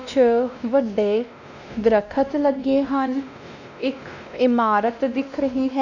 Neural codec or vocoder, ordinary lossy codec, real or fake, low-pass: codec, 16 kHz, 1 kbps, X-Codec, WavLM features, trained on Multilingual LibriSpeech; none; fake; 7.2 kHz